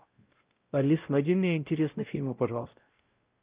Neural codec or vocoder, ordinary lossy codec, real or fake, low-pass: codec, 16 kHz, 0.5 kbps, X-Codec, WavLM features, trained on Multilingual LibriSpeech; Opus, 24 kbps; fake; 3.6 kHz